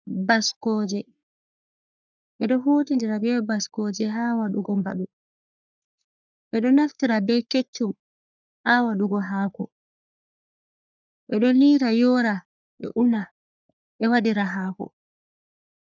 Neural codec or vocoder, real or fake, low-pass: codec, 44.1 kHz, 3.4 kbps, Pupu-Codec; fake; 7.2 kHz